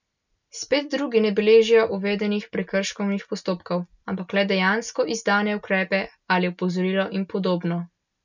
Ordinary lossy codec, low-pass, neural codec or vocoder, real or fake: none; 7.2 kHz; none; real